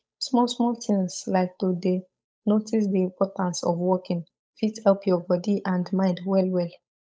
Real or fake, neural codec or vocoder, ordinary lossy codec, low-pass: fake; codec, 16 kHz, 8 kbps, FunCodec, trained on Chinese and English, 25 frames a second; none; none